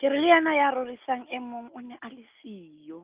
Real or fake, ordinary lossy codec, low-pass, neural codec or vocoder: real; Opus, 32 kbps; 3.6 kHz; none